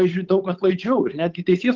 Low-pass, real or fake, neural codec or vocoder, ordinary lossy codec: 7.2 kHz; fake; codec, 16 kHz, 8 kbps, FunCodec, trained on Chinese and English, 25 frames a second; Opus, 32 kbps